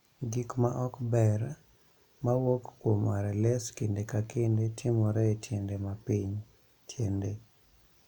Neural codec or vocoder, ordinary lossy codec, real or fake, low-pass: none; none; real; 19.8 kHz